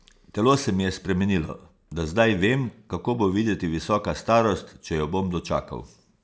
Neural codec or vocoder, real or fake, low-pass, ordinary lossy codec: none; real; none; none